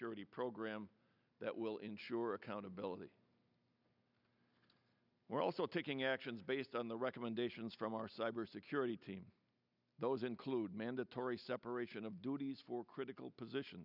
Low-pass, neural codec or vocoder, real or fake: 5.4 kHz; none; real